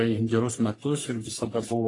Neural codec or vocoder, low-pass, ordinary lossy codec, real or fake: codec, 44.1 kHz, 3.4 kbps, Pupu-Codec; 10.8 kHz; AAC, 32 kbps; fake